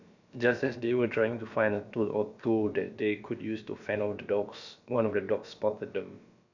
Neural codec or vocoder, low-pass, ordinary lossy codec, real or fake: codec, 16 kHz, about 1 kbps, DyCAST, with the encoder's durations; 7.2 kHz; none; fake